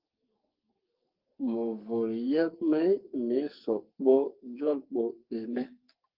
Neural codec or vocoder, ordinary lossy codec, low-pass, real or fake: codec, 44.1 kHz, 2.6 kbps, SNAC; Opus, 32 kbps; 5.4 kHz; fake